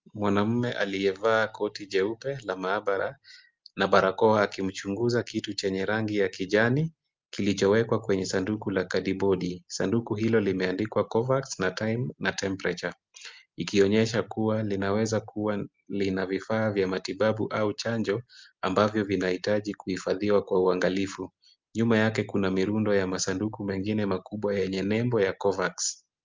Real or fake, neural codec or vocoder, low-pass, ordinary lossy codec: real; none; 7.2 kHz; Opus, 24 kbps